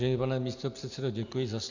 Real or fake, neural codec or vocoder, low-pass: real; none; 7.2 kHz